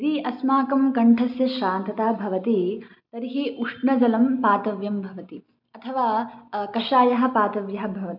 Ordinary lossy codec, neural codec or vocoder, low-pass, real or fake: none; none; 5.4 kHz; real